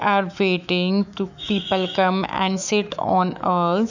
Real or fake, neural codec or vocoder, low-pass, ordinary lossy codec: fake; autoencoder, 48 kHz, 128 numbers a frame, DAC-VAE, trained on Japanese speech; 7.2 kHz; none